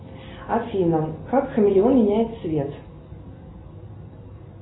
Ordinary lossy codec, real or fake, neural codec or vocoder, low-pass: AAC, 16 kbps; real; none; 7.2 kHz